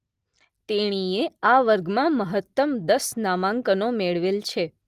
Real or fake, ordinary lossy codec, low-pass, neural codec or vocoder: real; Opus, 24 kbps; 14.4 kHz; none